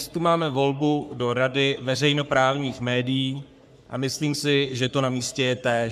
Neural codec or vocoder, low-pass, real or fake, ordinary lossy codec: codec, 44.1 kHz, 3.4 kbps, Pupu-Codec; 14.4 kHz; fake; MP3, 96 kbps